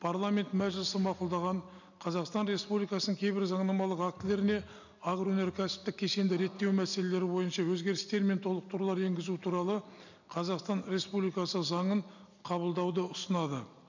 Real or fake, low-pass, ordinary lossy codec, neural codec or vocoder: real; 7.2 kHz; none; none